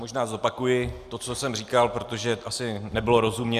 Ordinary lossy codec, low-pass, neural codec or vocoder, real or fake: Opus, 64 kbps; 14.4 kHz; none; real